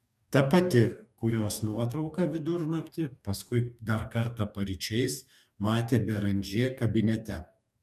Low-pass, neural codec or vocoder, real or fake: 14.4 kHz; codec, 44.1 kHz, 2.6 kbps, DAC; fake